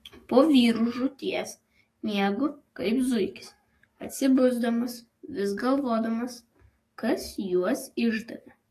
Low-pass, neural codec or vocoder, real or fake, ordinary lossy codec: 14.4 kHz; codec, 44.1 kHz, 7.8 kbps, DAC; fake; AAC, 48 kbps